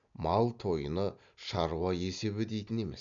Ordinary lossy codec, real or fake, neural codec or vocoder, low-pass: none; real; none; 7.2 kHz